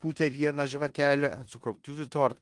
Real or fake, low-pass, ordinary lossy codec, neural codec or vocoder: fake; 10.8 kHz; Opus, 24 kbps; codec, 16 kHz in and 24 kHz out, 0.9 kbps, LongCat-Audio-Codec, four codebook decoder